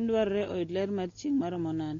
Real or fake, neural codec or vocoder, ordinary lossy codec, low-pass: real; none; AAC, 32 kbps; 7.2 kHz